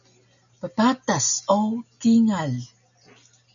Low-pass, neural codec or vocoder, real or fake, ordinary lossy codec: 7.2 kHz; none; real; MP3, 96 kbps